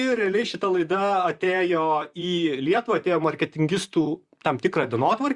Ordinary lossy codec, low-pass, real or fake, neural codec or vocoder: Opus, 64 kbps; 10.8 kHz; fake; vocoder, 44.1 kHz, 128 mel bands, Pupu-Vocoder